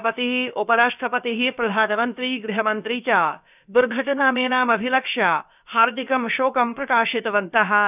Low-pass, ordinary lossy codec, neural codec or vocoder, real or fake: 3.6 kHz; none; codec, 16 kHz, about 1 kbps, DyCAST, with the encoder's durations; fake